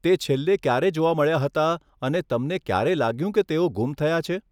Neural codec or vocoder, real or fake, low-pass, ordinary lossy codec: none; real; 19.8 kHz; none